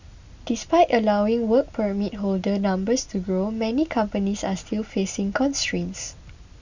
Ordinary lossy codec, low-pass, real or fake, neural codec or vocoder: Opus, 64 kbps; 7.2 kHz; real; none